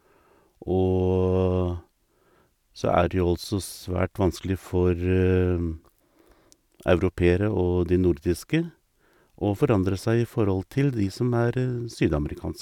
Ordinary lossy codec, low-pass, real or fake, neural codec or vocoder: none; 19.8 kHz; real; none